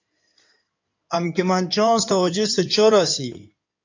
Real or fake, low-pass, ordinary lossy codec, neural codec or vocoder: fake; 7.2 kHz; AAC, 48 kbps; codec, 16 kHz in and 24 kHz out, 2.2 kbps, FireRedTTS-2 codec